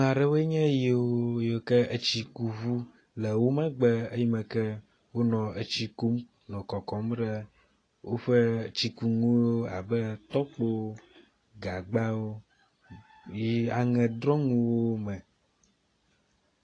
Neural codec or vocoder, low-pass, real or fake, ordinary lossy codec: none; 9.9 kHz; real; AAC, 32 kbps